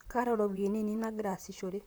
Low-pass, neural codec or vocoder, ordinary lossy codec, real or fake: none; vocoder, 44.1 kHz, 128 mel bands, Pupu-Vocoder; none; fake